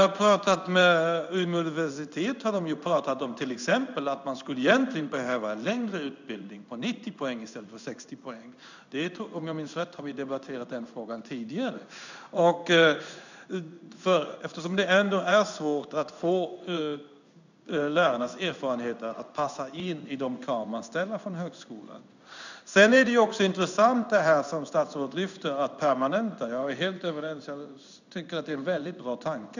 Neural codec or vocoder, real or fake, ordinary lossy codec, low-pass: codec, 16 kHz in and 24 kHz out, 1 kbps, XY-Tokenizer; fake; none; 7.2 kHz